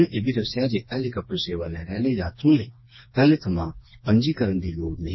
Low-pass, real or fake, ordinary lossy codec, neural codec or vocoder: 7.2 kHz; fake; MP3, 24 kbps; codec, 16 kHz, 2 kbps, FreqCodec, smaller model